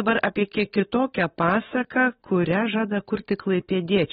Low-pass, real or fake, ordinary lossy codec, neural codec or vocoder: 9.9 kHz; real; AAC, 16 kbps; none